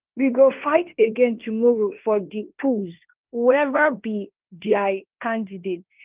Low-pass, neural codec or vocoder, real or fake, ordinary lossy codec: 3.6 kHz; codec, 16 kHz in and 24 kHz out, 0.9 kbps, LongCat-Audio-Codec, fine tuned four codebook decoder; fake; Opus, 32 kbps